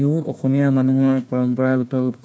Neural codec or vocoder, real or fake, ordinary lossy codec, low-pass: codec, 16 kHz, 1 kbps, FunCodec, trained on Chinese and English, 50 frames a second; fake; none; none